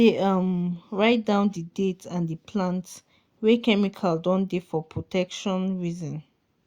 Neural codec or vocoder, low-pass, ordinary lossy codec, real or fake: none; 19.8 kHz; Opus, 64 kbps; real